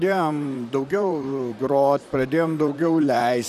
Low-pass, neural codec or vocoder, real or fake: 14.4 kHz; vocoder, 44.1 kHz, 128 mel bands, Pupu-Vocoder; fake